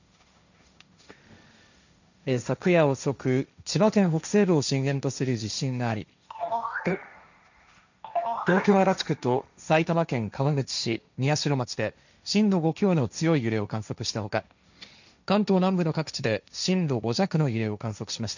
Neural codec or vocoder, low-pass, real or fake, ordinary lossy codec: codec, 16 kHz, 1.1 kbps, Voila-Tokenizer; 7.2 kHz; fake; none